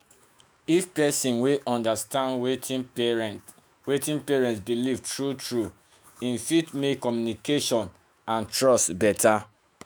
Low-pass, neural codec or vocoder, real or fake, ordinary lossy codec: none; autoencoder, 48 kHz, 128 numbers a frame, DAC-VAE, trained on Japanese speech; fake; none